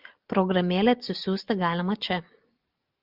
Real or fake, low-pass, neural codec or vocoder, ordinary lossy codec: real; 5.4 kHz; none; Opus, 24 kbps